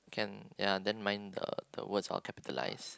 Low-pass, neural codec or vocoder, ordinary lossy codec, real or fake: none; none; none; real